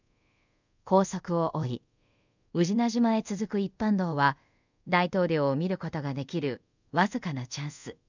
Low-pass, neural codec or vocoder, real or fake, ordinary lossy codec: 7.2 kHz; codec, 24 kHz, 0.5 kbps, DualCodec; fake; none